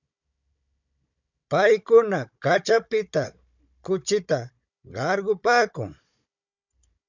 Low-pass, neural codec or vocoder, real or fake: 7.2 kHz; codec, 16 kHz, 16 kbps, FunCodec, trained on Chinese and English, 50 frames a second; fake